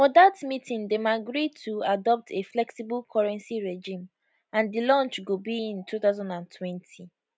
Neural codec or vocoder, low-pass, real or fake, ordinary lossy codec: none; none; real; none